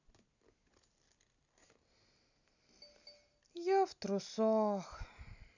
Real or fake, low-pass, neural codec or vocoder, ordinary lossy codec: real; 7.2 kHz; none; none